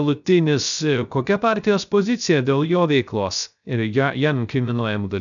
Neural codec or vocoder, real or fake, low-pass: codec, 16 kHz, 0.3 kbps, FocalCodec; fake; 7.2 kHz